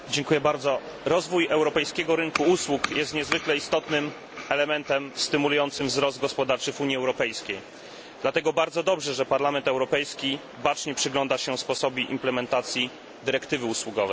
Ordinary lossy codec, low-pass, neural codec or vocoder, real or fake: none; none; none; real